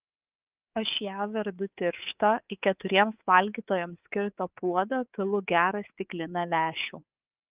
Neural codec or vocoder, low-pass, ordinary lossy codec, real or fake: codec, 24 kHz, 3.1 kbps, DualCodec; 3.6 kHz; Opus, 24 kbps; fake